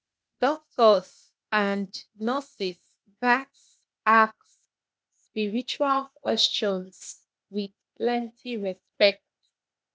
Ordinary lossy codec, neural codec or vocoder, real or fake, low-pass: none; codec, 16 kHz, 0.8 kbps, ZipCodec; fake; none